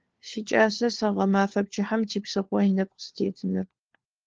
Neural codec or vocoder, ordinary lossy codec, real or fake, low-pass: codec, 16 kHz, 4 kbps, FunCodec, trained on LibriTTS, 50 frames a second; Opus, 16 kbps; fake; 7.2 kHz